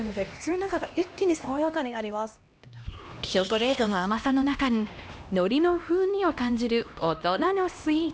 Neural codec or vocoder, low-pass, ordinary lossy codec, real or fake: codec, 16 kHz, 1 kbps, X-Codec, HuBERT features, trained on LibriSpeech; none; none; fake